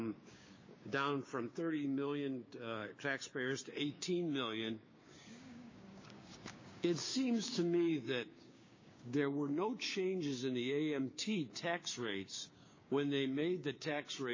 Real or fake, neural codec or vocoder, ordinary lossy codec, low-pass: fake; codec, 16 kHz, 6 kbps, DAC; MP3, 32 kbps; 7.2 kHz